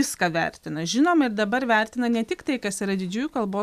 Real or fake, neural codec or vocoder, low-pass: real; none; 14.4 kHz